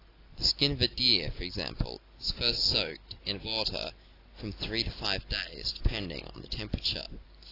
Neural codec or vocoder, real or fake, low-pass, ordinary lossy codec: none; real; 5.4 kHz; AAC, 32 kbps